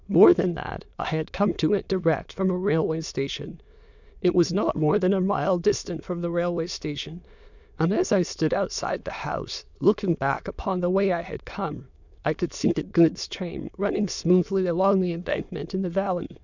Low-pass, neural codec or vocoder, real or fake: 7.2 kHz; autoencoder, 22.05 kHz, a latent of 192 numbers a frame, VITS, trained on many speakers; fake